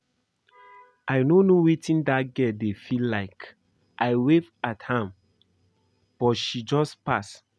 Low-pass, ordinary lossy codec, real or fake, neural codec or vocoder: none; none; real; none